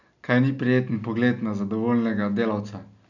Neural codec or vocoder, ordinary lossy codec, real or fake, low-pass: none; none; real; 7.2 kHz